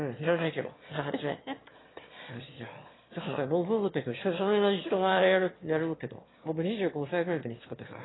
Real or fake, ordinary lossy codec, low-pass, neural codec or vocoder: fake; AAC, 16 kbps; 7.2 kHz; autoencoder, 22.05 kHz, a latent of 192 numbers a frame, VITS, trained on one speaker